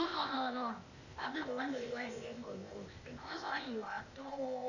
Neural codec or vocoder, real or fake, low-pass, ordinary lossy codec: codec, 16 kHz, 0.8 kbps, ZipCodec; fake; 7.2 kHz; none